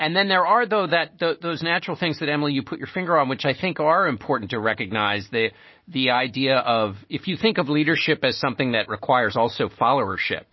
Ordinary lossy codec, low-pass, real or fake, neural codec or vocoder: MP3, 24 kbps; 7.2 kHz; real; none